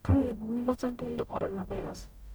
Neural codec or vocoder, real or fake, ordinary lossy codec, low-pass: codec, 44.1 kHz, 0.9 kbps, DAC; fake; none; none